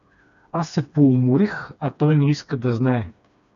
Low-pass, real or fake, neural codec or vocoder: 7.2 kHz; fake; codec, 16 kHz, 2 kbps, FreqCodec, smaller model